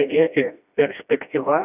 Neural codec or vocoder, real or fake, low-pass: codec, 16 kHz, 1 kbps, FreqCodec, smaller model; fake; 3.6 kHz